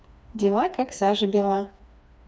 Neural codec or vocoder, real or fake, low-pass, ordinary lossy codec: codec, 16 kHz, 2 kbps, FreqCodec, smaller model; fake; none; none